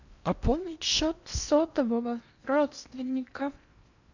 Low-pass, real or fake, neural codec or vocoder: 7.2 kHz; fake; codec, 16 kHz in and 24 kHz out, 0.8 kbps, FocalCodec, streaming, 65536 codes